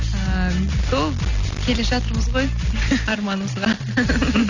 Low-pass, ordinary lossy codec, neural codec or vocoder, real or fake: 7.2 kHz; none; none; real